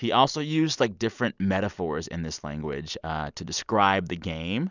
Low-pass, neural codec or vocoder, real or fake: 7.2 kHz; none; real